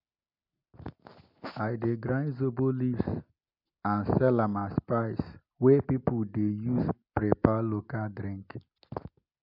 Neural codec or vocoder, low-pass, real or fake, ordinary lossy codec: none; 5.4 kHz; real; MP3, 48 kbps